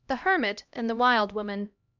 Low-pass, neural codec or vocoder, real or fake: 7.2 kHz; codec, 16 kHz, 1 kbps, X-Codec, HuBERT features, trained on LibriSpeech; fake